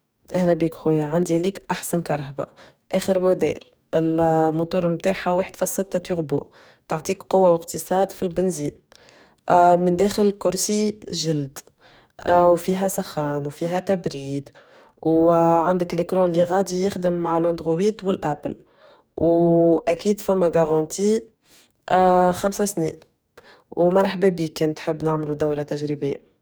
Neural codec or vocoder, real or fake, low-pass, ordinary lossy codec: codec, 44.1 kHz, 2.6 kbps, DAC; fake; none; none